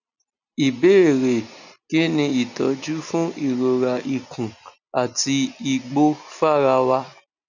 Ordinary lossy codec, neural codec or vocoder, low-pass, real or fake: none; none; 7.2 kHz; real